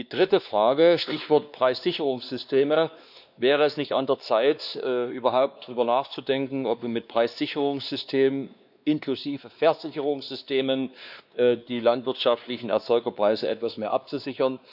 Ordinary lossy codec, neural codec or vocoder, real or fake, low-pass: none; codec, 16 kHz, 2 kbps, X-Codec, WavLM features, trained on Multilingual LibriSpeech; fake; 5.4 kHz